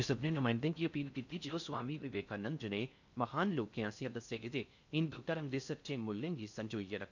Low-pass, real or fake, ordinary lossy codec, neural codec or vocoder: 7.2 kHz; fake; none; codec, 16 kHz in and 24 kHz out, 0.6 kbps, FocalCodec, streaming, 4096 codes